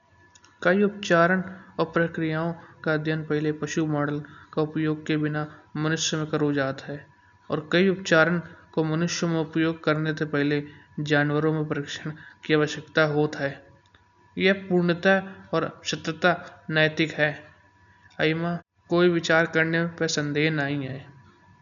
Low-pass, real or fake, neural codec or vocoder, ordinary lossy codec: 7.2 kHz; real; none; none